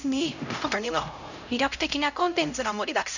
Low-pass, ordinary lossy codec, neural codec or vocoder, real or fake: 7.2 kHz; none; codec, 16 kHz, 0.5 kbps, X-Codec, HuBERT features, trained on LibriSpeech; fake